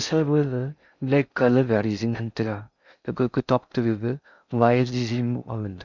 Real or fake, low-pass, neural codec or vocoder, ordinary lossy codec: fake; 7.2 kHz; codec, 16 kHz in and 24 kHz out, 0.6 kbps, FocalCodec, streaming, 4096 codes; none